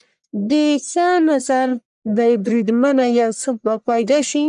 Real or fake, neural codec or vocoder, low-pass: fake; codec, 44.1 kHz, 1.7 kbps, Pupu-Codec; 10.8 kHz